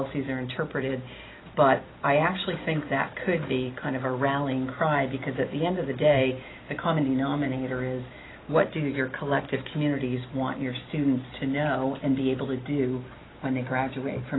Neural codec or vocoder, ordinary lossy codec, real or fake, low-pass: none; AAC, 16 kbps; real; 7.2 kHz